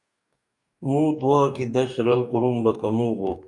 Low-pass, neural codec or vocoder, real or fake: 10.8 kHz; codec, 44.1 kHz, 2.6 kbps, DAC; fake